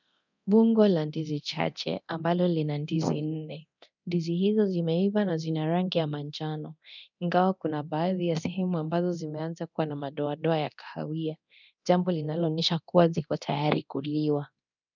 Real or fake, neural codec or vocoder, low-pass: fake; codec, 24 kHz, 0.9 kbps, DualCodec; 7.2 kHz